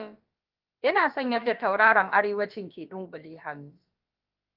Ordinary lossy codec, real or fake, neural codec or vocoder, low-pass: Opus, 32 kbps; fake; codec, 16 kHz, about 1 kbps, DyCAST, with the encoder's durations; 5.4 kHz